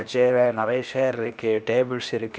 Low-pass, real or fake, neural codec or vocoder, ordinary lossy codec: none; fake; codec, 16 kHz, 0.8 kbps, ZipCodec; none